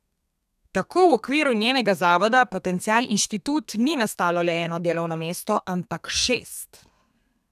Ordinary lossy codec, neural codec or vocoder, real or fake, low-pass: none; codec, 32 kHz, 1.9 kbps, SNAC; fake; 14.4 kHz